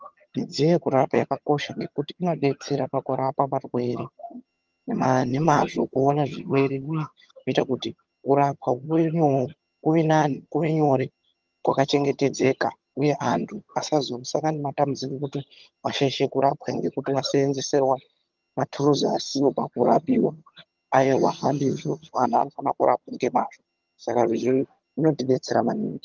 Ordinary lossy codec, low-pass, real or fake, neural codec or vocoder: Opus, 24 kbps; 7.2 kHz; fake; vocoder, 22.05 kHz, 80 mel bands, HiFi-GAN